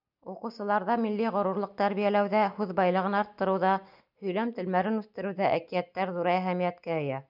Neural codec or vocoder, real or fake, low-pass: none; real; 5.4 kHz